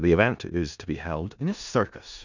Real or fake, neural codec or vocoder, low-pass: fake; codec, 16 kHz in and 24 kHz out, 0.4 kbps, LongCat-Audio-Codec, four codebook decoder; 7.2 kHz